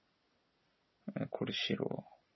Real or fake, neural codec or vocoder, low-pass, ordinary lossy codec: real; none; 7.2 kHz; MP3, 24 kbps